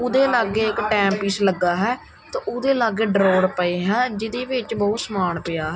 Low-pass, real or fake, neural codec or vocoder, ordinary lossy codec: none; real; none; none